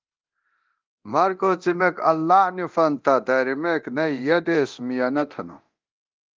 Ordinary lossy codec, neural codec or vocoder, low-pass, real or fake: Opus, 32 kbps; codec, 24 kHz, 0.9 kbps, DualCodec; 7.2 kHz; fake